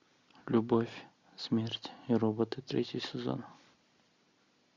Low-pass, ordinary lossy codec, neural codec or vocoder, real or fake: 7.2 kHz; MP3, 64 kbps; none; real